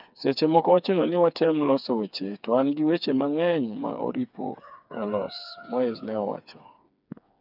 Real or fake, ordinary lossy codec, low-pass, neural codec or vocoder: fake; none; 5.4 kHz; codec, 16 kHz, 4 kbps, FreqCodec, smaller model